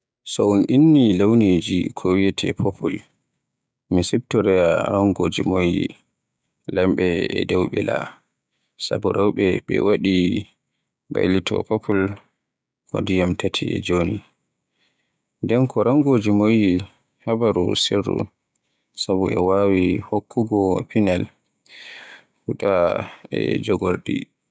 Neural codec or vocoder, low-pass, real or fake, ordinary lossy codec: codec, 16 kHz, 6 kbps, DAC; none; fake; none